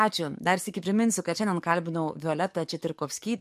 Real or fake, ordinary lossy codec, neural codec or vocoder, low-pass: fake; MP3, 64 kbps; codec, 44.1 kHz, 7.8 kbps, DAC; 14.4 kHz